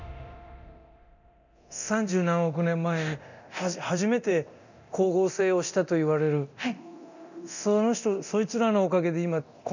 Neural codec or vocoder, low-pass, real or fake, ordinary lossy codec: codec, 24 kHz, 0.9 kbps, DualCodec; 7.2 kHz; fake; none